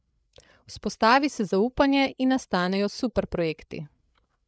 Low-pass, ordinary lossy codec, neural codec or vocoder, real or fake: none; none; codec, 16 kHz, 8 kbps, FreqCodec, larger model; fake